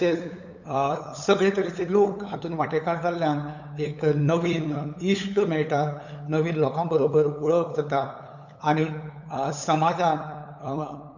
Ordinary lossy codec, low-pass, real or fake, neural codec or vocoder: none; 7.2 kHz; fake; codec, 16 kHz, 8 kbps, FunCodec, trained on LibriTTS, 25 frames a second